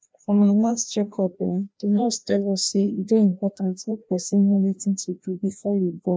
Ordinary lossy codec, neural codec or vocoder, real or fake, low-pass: none; codec, 16 kHz, 1 kbps, FreqCodec, larger model; fake; none